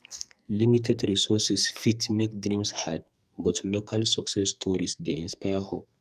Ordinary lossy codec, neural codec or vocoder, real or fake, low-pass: none; codec, 44.1 kHz, 2.6 kbps, SNAC; fake; 14.4 kHz